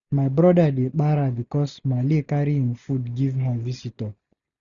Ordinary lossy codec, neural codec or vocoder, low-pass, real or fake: none; none; 7.2 kHz; real